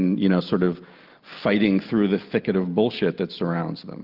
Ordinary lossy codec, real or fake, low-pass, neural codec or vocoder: Opus, 24 kbps; real; 5.4 kHz; none